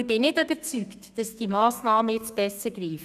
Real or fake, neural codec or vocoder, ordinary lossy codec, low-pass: fake; codec, 32 kHz, 1.9 kbps, SNAC; none; 14.4 kHz